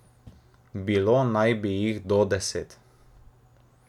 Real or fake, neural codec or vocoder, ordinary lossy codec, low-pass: real; none; none; 19.8 kHz